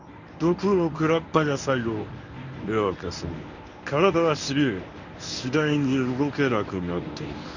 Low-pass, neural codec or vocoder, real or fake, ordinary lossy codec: 7.2 kHz; codec, 24 kHz, 0.9 kbps, WavTokenizer, medium speech release version 1; fake; none